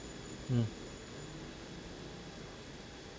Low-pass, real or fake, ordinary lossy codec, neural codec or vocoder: none; real; none; none